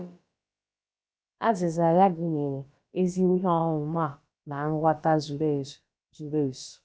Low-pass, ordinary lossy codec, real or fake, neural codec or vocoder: none; none; fake; codec, 16 kHz, about 1 kbps, DyCAST, with the encoder's durations